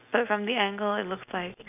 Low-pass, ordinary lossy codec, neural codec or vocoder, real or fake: 3.6 kHz; none; none; real